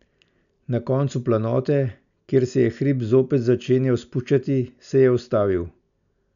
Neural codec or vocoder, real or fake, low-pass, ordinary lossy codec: none; real; 7.2 kHz; none